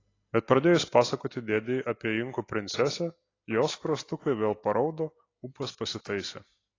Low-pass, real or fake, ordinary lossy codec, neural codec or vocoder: 7.2 kHz; real; AAC, 32 kbps; none